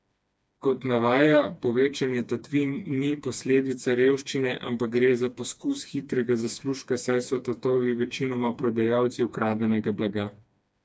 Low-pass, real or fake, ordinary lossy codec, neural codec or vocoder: none; fake; none; codec, 16 kHz, 2 kbps, FreqCodec, smaller model